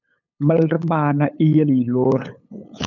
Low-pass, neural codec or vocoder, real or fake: 7.2 kHz; codec, 16 kHz, 8 kbps, FunCodec, trained on LibriTTS, 25 frames a second; fake